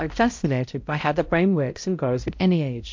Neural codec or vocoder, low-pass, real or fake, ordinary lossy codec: codec, 16 kHz, 0.5 kbps, X-Codec, HuBERT features, trained on balanced general audio; 7.2 kHz; fake; MP3, 48 kbps